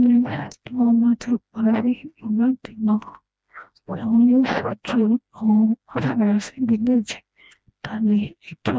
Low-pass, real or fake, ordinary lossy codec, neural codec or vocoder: none; fake; none; codec, 16 kHz, 1 kbps, FreqCodec, smaller model